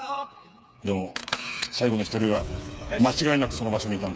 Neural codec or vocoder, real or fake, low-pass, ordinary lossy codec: codec, 16 kHz, 4 kbps, FreqCodec, smaller model; fake; none; none